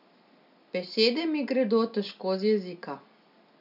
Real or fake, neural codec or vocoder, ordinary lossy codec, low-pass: real; none; none; 5.4 kHz